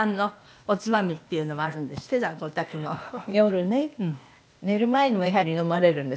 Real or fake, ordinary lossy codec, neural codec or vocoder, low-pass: fake; none; codec, 16 kHz, 0.8 kbps, ZipCodec; none